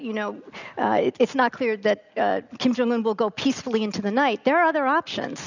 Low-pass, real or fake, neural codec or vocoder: 7.2 kHz; real; none